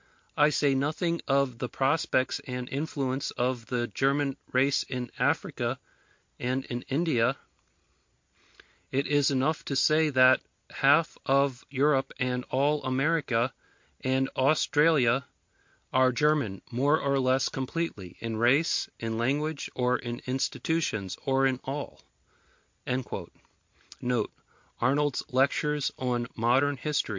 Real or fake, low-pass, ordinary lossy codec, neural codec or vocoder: real; 7.2 kHz; MP3, 48 kbps; none